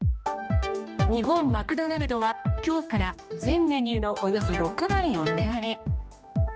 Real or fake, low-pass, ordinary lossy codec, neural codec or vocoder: fake; none; none; codec, 16 kHz, 1 kbps, X-Codec, HuBERT features, trained on general audio